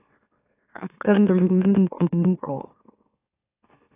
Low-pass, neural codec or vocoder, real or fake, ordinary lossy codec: 3.6 kHz; autoencoder, 44.1 kHz, a latent of 192 numbers a frame, MeloTTS; fake; AAC, 24 kbps